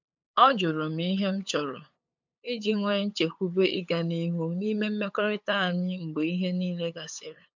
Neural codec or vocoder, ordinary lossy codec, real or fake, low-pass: codec, 16 kHz, 8 kbps, FunCodec, trained on LibriTTS, 25 frames a second; MP3, 64 kbps; fake; 7.2 kHz